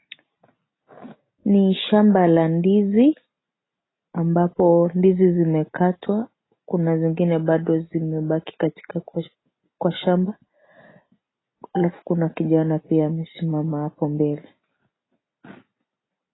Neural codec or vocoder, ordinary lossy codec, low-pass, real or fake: none; AAC, 16 kbps; 7.2 kHz; real